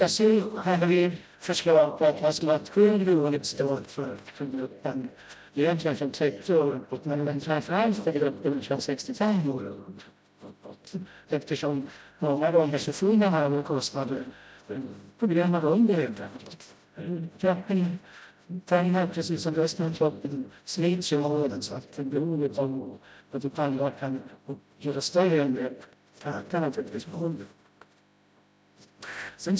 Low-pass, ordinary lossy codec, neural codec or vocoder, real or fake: none; none; codec, 16 kHz, 0.5 kbps, FreqCodec, smaller model; fake